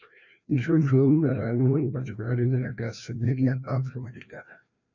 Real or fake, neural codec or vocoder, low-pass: fake; codec, 16 kHz, 1 kbps, FreqCodec, larger model; 7.2 kHz